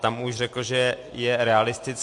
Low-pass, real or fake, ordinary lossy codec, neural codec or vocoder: 10.8 kHz; real; MP3, 48 kbps; none